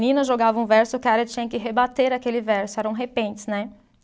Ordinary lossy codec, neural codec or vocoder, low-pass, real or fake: none; none; none; real